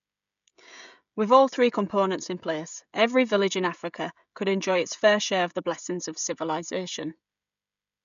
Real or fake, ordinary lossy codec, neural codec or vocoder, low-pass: fake; none; codec, 16 kHz, 16 kbps, FreqCodec, smaller model; 7.2 kHz